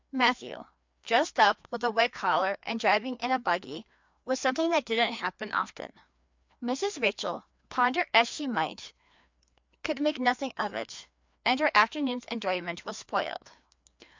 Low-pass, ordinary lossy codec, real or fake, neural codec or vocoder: 7.2 kHz; MP3, 64 kbps; fake; codec, 16 kHz, 2 kbps, FreqCodec, larger model